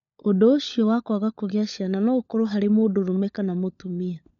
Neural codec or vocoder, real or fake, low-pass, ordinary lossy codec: codec, 16 kHz, 16 kbps, FunCodec, trained on LibriTTS, 50 frames a second; fake; 7.2 kHz; none